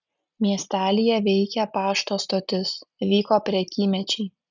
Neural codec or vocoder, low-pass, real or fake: none; 7.2 kHz; real